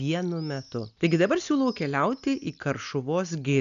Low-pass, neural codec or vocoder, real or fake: 7.2 kHz; none; real